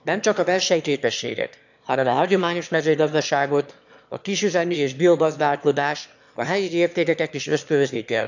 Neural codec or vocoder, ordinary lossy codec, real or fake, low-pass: autoencoder, 22.05 kHz, a latent of 192 numbers a frame, VITS, trained on one speaker; none; fake; 7.2 kHz